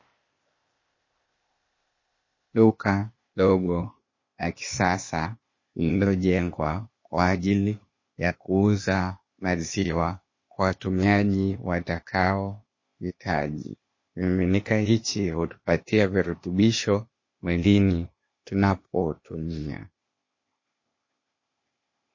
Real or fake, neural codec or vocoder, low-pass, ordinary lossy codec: fake; codec, 16 kHz, 0.8 kbps, ZipCodec; 7.2 kHz; MP3, 32 kbps